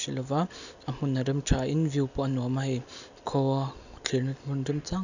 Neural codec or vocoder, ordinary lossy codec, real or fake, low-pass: none; none; real; 7.2 kHz